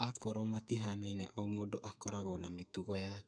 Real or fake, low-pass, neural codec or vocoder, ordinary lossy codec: fake; 9.9 kHz; codec, 32 kHz, 1.9 kbps, SNAC; none